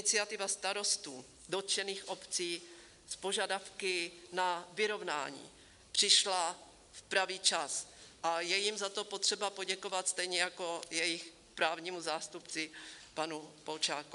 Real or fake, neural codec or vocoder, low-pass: real; none; 10.8 kHz